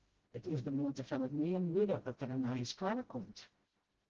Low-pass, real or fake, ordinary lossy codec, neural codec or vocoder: 7.2 kHz; fake; Opus, 16 kbps; codec, 16 kHz, 0.5 kbps, FreqCodec, smaller model